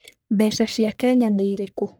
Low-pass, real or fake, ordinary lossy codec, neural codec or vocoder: none; fake; none; codec, 44.1 kHz, 1.7 kbps, Pupu-Codec